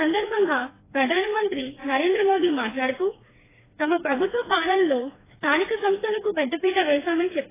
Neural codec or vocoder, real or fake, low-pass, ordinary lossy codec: codec, 16 kHz, 2 kbps, FreqCodec, smaller model; fake; 3.6 kHz; AAC, 16 kbps